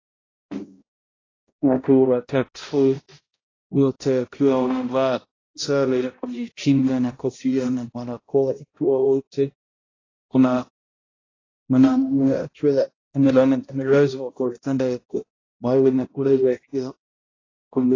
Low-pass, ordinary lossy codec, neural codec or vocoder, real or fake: 7.2 kHz; AAC, 32 kbps; codec, 16 kHz, 0.5 kbps, X-Codec, HuBERT features, trained on balanced general audio; fake